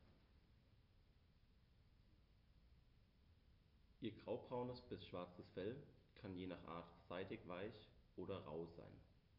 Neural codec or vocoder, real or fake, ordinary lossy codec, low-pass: none; real; none; 5.4 kHz